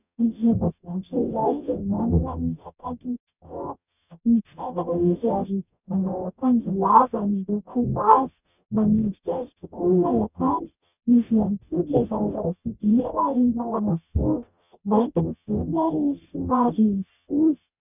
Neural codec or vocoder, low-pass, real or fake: codec, 44.1 kHz, 0.9 kbps, DAC; 3.6 kHz; fake